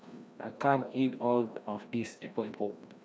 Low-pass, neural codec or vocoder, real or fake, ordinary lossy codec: none; codec, 16 kHz, 1 kbps, FreqCodec, larger model; fake; none